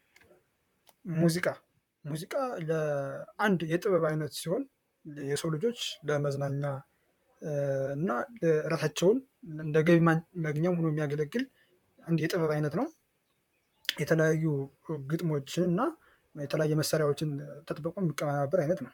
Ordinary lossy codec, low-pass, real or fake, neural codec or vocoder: MP3, 96 kbps; 19.8 kHz; fake; vocoder, 44.1 kHz, 128 mel bands, Pupu-Vocoder